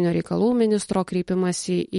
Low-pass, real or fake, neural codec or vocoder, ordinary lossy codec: 19.8 kHz; real; none; MP3, 48 kbps